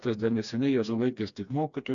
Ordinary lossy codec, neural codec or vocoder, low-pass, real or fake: AAC, 64 kbps; codec, 16 kHz, 2 kbps, FreqCodec, smaller model; 7.2 kHz; fake